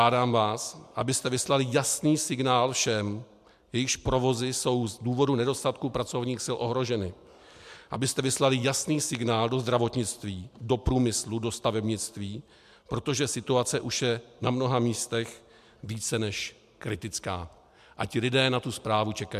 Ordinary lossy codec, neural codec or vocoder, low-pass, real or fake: MP3, 96 kbps; none; 14.4 kHz; real